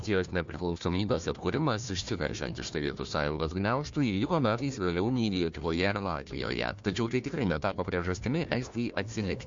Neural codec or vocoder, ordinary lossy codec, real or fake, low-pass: codec, 16 kHz, 1 kbps, FunCodec, trained on Chinese and English, 50 frames a second; MP3, 48 kbps; fake; 7.2 kHz